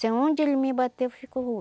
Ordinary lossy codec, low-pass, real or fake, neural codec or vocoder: none; none; real; none